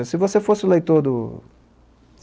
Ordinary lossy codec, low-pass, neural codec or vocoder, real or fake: none; none; none; real